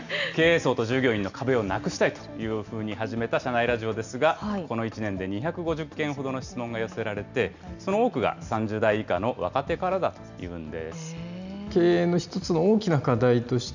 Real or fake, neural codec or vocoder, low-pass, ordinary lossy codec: real; none; 7.2 kHz; none